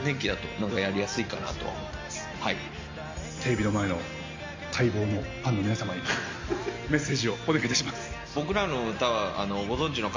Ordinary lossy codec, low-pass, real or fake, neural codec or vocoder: MP3, 48 kbps; 7.2 kHz; real; none